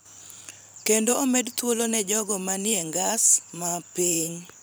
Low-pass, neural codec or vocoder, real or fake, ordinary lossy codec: none; vocoder, 44.1 kHz, 128 mel bands every 512 samples, BigVGAN v2; fake; none